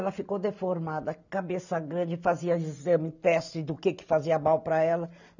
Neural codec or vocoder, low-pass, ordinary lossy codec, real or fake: none; 7.2 kHz; none; real